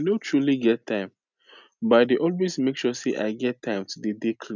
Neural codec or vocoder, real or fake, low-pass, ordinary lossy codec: none; real; 7.2 kHz; none